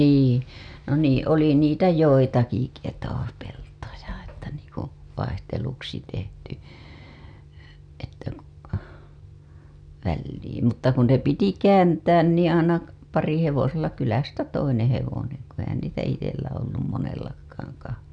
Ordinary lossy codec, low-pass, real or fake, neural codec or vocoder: none; 9.9 kHz; real; none